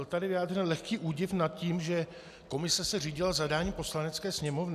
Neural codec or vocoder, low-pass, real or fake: none; 14.4 kHz; real